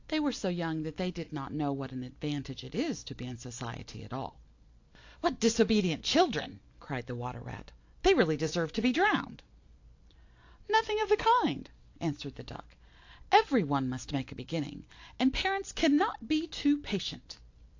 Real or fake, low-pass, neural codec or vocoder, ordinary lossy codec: real; 7.2 kHz; none; AAC, 48 kbps